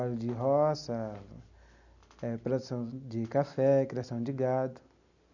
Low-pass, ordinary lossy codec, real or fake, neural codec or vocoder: 7.2 kHz; none; real; none